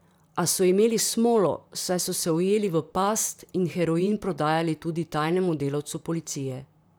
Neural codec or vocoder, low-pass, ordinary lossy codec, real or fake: vocoder, 44.1 kHz, 128 mel bands, Pupu-Vocoder; none; none; fake